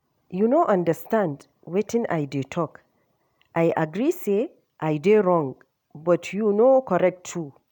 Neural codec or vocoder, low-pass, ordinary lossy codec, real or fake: none; 19.8 kHz; none; real